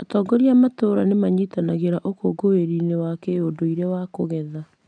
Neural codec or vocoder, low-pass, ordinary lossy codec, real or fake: none; 9.9 kHz; none; real